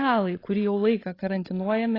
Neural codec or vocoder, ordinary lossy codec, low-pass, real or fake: codec, 16 kHz, 16 kbps, FreqCodec, larger model; AAC, 24 kbps; 5.4 kHz; fake